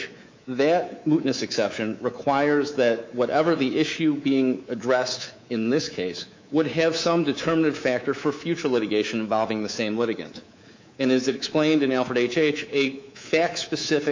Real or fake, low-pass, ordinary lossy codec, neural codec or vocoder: fake; 7.2 kHz; MP3, 64 kbps; codec, 24 kHz, 3.1 kbps, DualCodec